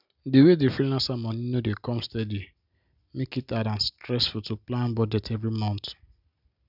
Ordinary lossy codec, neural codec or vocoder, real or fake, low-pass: none; none; real; 5.4 kHz